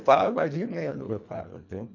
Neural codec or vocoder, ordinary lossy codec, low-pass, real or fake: codec, 24 kHz, 1.5 kbps, HILCodec; none; 7.2 kHz; fake